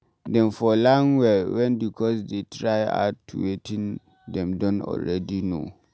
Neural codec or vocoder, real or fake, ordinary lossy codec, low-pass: none; real; none; none